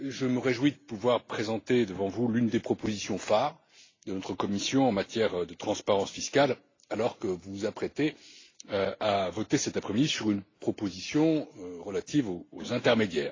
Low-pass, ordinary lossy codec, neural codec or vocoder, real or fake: 7.2 kHz; AAC, 32 kbps; none; real